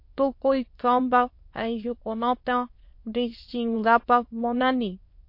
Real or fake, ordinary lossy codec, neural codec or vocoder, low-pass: fake; MP3, 32 kbps; autoencoder, 22.05 kHz, a latent of 192 numbers a frame, VITS, trained on many speakers; 5.4 kHz